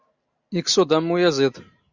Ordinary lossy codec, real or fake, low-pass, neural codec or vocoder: Opus, 64 kbps; real; 7.2 kHz; none